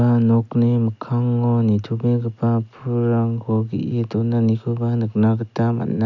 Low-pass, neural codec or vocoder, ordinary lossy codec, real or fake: 7.2 kHz; none; none; real